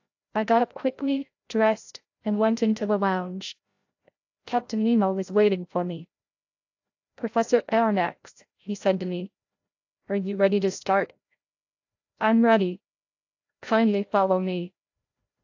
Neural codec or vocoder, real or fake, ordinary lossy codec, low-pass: codec, 16 kHz, 0.5 kbps, FreqCodec, larger model; fake; AAC, 48 kbps; 7.2 kHz